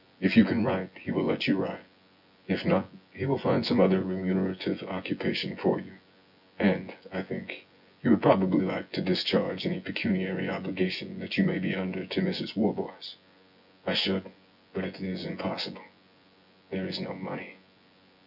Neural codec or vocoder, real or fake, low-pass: vocoder, 24 kHz, 100 mel bands, Vocos; fake; 5.4 kHz